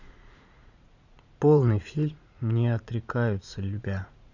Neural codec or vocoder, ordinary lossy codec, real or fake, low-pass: vocoder, 44.1 kHz, 80 mel bands, Vocos; none; fake; 7.2 kHz